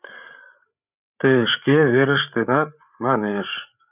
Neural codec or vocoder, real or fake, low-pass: codec, 16 kHz, 8 kbps, FreqCodec, larger model; fake; 3.6 kHz